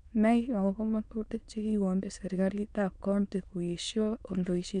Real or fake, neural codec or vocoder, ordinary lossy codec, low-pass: fake; autoencoder, 22.05 kHz, a latent of 192 numbers a frame, VITS, trained on many speakers; none; 9.9 kHz